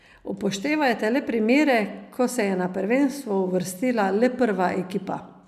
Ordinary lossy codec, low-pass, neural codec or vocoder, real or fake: none; 14.4 kHz; none; real